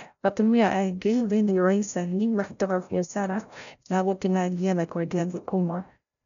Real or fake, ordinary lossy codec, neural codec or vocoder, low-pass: fake; MP3, 96 kbps; codec, 16 kHz, 0.5 kbps, FreqCodec, larger model; 7.2 kHz